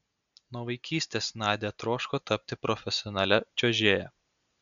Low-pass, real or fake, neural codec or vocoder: 7.2 kHz; real; none